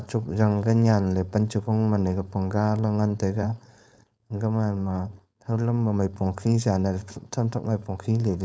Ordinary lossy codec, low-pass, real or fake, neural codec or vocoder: none; none; fake; codec, 16 kHz, 4.8 kbps, FACodec